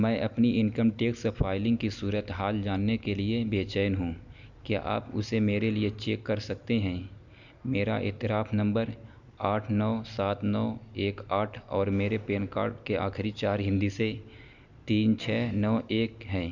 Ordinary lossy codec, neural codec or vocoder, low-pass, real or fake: none; none; 7.2 kHz; real